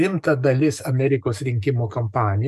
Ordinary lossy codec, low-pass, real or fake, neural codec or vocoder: MP3, 96 kbps; 14.4 kHz; fake; codec, 44.1 kHz, 7.8 kbps, DAC